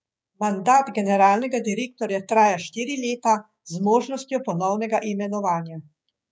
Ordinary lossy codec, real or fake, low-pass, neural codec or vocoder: none; fake; none; codec, 16 kHz, 6 kbps, DAC